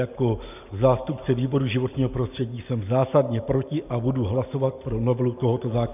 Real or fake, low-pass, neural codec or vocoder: real; 3.6 kHz; none